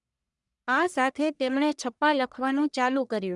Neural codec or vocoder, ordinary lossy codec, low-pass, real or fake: codec, 44.1 kHz, 1.7 kbps, Pupu-Codec; none; 10.8 kHz; fake